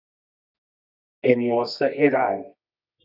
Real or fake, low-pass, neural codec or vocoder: fake; 5.4 kHz; codec, 24 kHz, 0.9 kbps, WavTokenizer, medium music audio release